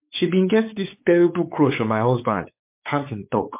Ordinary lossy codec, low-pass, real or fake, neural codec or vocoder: MP3, 32 kbps; 3.6 kHz; fake; codec, 16 kHz, 4 kbps, X-Codec, WavLM features, trained on Multilingual LibriSpeech